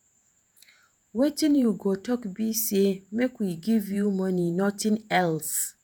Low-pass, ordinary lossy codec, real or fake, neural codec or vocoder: none; none; fake; vocoder, 48 kHz, 128 mel bands, Vocos